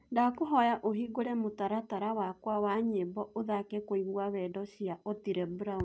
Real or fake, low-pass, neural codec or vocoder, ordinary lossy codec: real; none; none; none